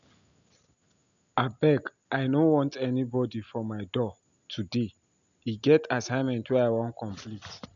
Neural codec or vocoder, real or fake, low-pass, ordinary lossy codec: none; real; 7.2 kHz; none